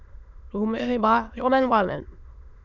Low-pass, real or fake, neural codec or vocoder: 7.2 kHz; fake; autoencoder, 22.05 kHz, a latent of 192 numbers a frame, VITS, trained on many speakers